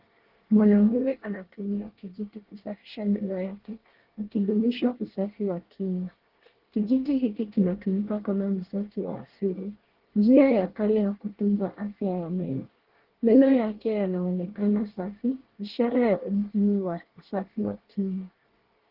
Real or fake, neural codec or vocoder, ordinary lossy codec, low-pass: fake; codec, 24 kHz, 1 kbps, SNAC; Opus, 16 kbps; 5.4 kHz